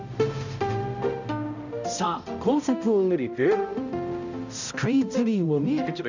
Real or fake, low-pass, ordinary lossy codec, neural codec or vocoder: fake; 7.2 kHz; none; codec, 16 kHz, 0.5 kbps, X-Codec, HuBERT features, trained on balanced general audio